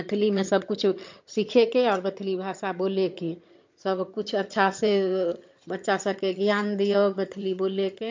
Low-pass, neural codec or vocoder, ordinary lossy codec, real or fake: 7.2 kHz; vocoder, 22.05 kHz, 80 mel bands, HiFi-GAN; MP3, 48 kbps; fake